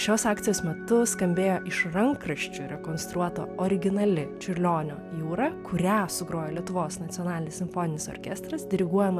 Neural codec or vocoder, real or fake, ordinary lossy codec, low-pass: none; real; AAC, 96 kbps; 14.4 kHz